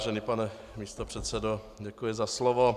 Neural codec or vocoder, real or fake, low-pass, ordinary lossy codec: none; real; 14.4 kHz; AAC, 96 kbps